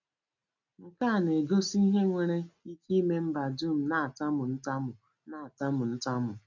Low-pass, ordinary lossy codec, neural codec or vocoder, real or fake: 7.2 kHz; MP3, 64 kbps; none; real